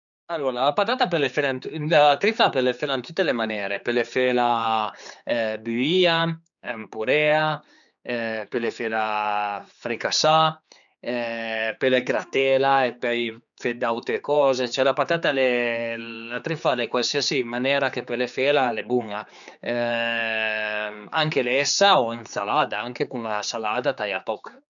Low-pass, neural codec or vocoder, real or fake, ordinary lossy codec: 7.2 kHz; codec, 16 kHz, 4 kbps, X-Codec, HuBERT features, trained on general audio; fake; none